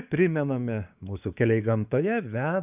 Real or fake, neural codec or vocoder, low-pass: fake; codec, 16 kHz, 6 kbps, DAC; 3.6 kHz